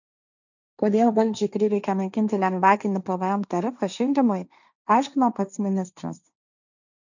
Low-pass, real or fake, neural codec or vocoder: 7.2 kHz; fake; codec, 16 kHz, 1.1 kbps, Voila-Tokenizer